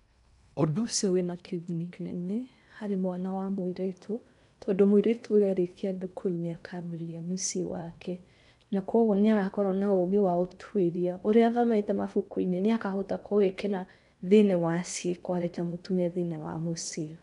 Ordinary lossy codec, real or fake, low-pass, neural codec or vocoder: none; fake; 10.8 kHz; codec, 16 kHz in and 24 kHz out, 0.8 kbps, FocalCodec, streaming, 65536 codes